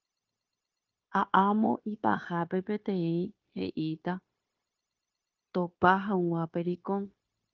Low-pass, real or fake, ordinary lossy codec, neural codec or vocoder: 7.2 kHz; fake; Opus, 24 kbps; codec, 16 kHz, 0.9 kbps, LongCat-Audio-Codec